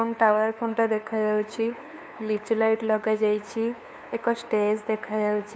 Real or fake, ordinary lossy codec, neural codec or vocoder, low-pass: fake; none; codec, 16 kHz, 2 kbps, FunCodec, trained on LibriTTS, 25 frames a second; none